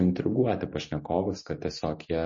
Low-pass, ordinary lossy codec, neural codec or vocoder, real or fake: 7.2 kHz; MP3, 32 kbps; none; real